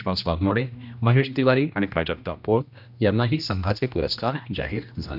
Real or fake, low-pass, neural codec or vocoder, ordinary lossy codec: fake; 5.4 kHz; codec, 16 kHz, 1 kbps, X-Codec, HuBERT features, trained on general audio; none